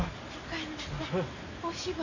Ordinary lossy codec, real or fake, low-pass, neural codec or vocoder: none; real; 7.2 kHz; none